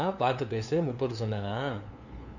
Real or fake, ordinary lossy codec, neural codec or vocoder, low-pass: fake; none; codec, 16 kHz, 2 kbps, FunCodec, trained on LibriTTS, 25 frames a second; 7.2 kHz